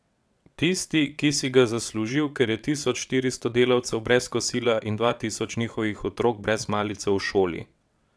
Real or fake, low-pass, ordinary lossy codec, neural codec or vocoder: fake; none; none; vocoder, 22.05 kHz, 80 mel bands, WaveNeXt